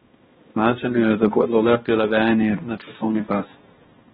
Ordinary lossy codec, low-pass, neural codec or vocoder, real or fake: AAC, 16 kbps; 7.2 kHz; codec, 16 kHz, 1 kbps, X-Codec, HuBERT features, trained on balanced general audio; fake